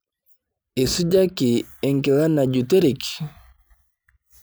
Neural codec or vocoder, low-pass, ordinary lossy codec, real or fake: none; none; none; real